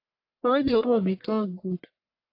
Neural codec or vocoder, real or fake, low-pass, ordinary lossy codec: codec, 44.1 kHz, 1.7 kbps, Pupu-Codec; fake; 5.4 kHz; AAC, 32 kbps